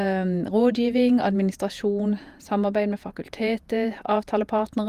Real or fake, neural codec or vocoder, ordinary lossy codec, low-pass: fake; vocoder, 48 kHz, 128 mel bands, Vocos; Opus, 32 kbps; 14.4 kHz